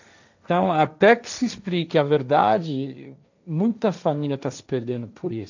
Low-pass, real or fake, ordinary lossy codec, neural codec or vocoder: 7.2 kHz; fake; none; codec, 16 kHz, 1.1 kbps, Voila-Tokenizer